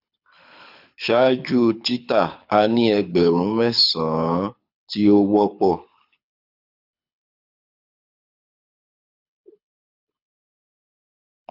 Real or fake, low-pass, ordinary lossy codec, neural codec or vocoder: fake; 5.4 kHz; none; codec, 24 kHz, 6 kbps, HILCodec